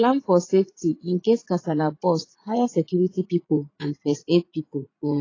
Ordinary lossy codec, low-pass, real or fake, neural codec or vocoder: AAC, 32 kbps; 7.2 kHz; fake; vocoder, 44.1 kHz, 80 mel bands, Vocos